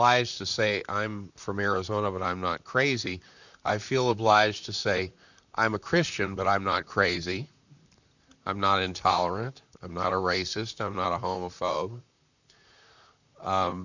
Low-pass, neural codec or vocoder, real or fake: 7.2 kHz; vocoder, 44.1 kHz, 128 mel bands, Pupu-Vocoder; fake